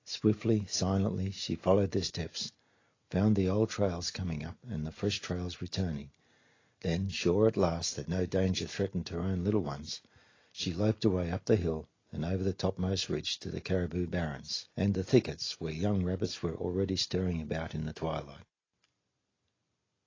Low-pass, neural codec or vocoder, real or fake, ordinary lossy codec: 7.2 kHz; none; real; AAC, 32 kbps